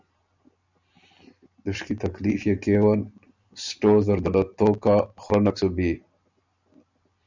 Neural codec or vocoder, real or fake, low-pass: none; real; 7.2 kHz